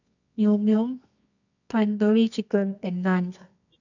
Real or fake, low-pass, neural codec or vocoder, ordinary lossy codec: fake; 7.2 kHz; codec, 24 kHz, 0.9 kbps, WavTokenizer, medium music audio release; none